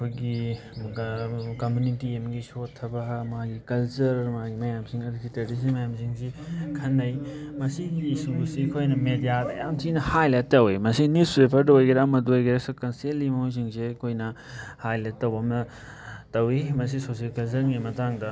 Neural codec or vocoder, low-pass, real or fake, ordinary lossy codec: none; none; real; none